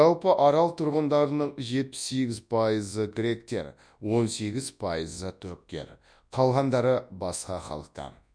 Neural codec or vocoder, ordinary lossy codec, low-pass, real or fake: codec, 24 kHz, 0.9 kbps, WavTokenizer, large speech release; none; 9.9 kHz; fake